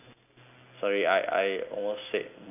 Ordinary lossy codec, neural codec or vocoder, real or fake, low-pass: none; none; real; 3.6 kHz